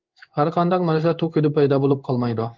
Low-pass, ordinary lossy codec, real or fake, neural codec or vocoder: 7.2 kHz; Opus, 32 kbps; fake; codec, 16 kHz in and 24 kHz out, 1 kbps, XY-Tokenizer